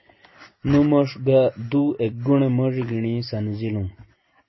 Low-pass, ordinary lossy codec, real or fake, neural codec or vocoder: 7.2 kHz; MP3, 24 kbps; real; none